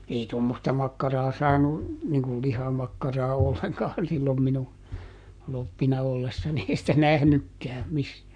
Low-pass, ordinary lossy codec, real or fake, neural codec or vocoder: 9.9 kHz; none; fake; codec, 44.1 kHz, 7.8 kbps, Pupu-Codec